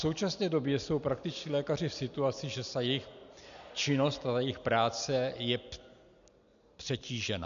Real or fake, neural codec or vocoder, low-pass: real; none; 7.2 kHz